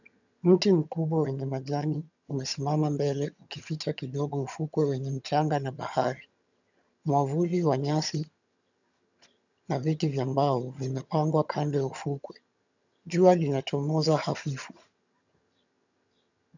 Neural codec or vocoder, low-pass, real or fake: vocoder, 22.05 kHz, 80 mel bands, HiFi-GAN; 7.2 kHz; fake